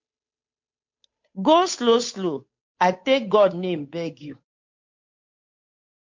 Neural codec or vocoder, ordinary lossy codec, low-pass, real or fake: codec, 16 kHz, 8 kbps, FunCodec, trained on Chinese and English, 25 frames a second; MP3, 48 kbps; 7.2 kHz; fake